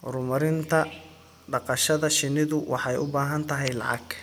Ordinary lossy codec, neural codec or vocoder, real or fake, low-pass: none; none; real; none